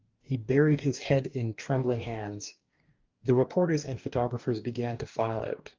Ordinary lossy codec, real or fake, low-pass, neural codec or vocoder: Opus, 24 kbps; fake; 7.2 kHz; codec, 44.1 kHz, 2.6 kbps, DAC